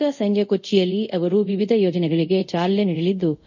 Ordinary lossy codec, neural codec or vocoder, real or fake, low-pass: none; codec, 24 kHz, 0.5 kbps, DualCodec; fake; 7.2 kHz